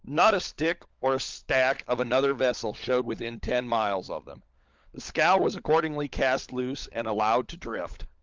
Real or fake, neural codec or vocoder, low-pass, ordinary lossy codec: fake; codec, 16 kHz, 16 kbps, FunCodec, trained on LibriTTS, 50 frames a second; 7.2 kHz; Opus, 32 kbps